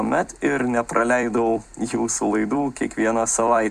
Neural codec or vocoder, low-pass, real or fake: vocoder, 44.1 kHz, 128 mel bands every 256 samples, BigVGAN v2; 14.4 kHz; fake